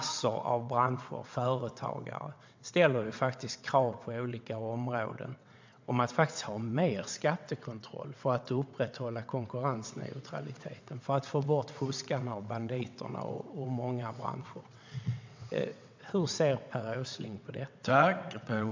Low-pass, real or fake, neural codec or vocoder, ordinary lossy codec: 7.2 kHz; fake; vocoder, 22.05 kHz, 80 mel bands, WaveNeXt; MP3, 64 kbps